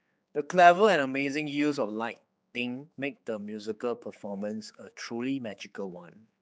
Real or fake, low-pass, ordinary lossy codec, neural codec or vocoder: fake; none; none; codec, 16 kHz, 4 kbps, X-Codec, HuBERT features, trained on general audio